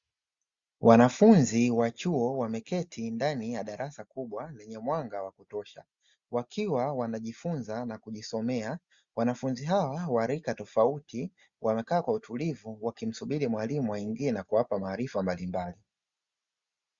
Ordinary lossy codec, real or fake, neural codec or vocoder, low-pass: Opus, 64 kbps; real; none; 7.2 kHz